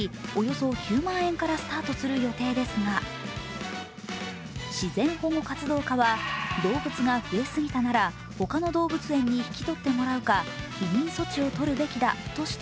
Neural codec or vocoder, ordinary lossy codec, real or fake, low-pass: none; none; real; none